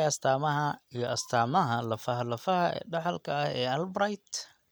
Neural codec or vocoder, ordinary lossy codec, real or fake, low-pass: none; none; real; none